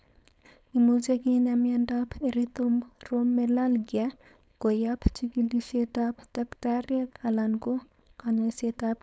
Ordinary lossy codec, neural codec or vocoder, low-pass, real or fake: none; codec, 16 kHz, 4.8 kbps, FACodec; none; fake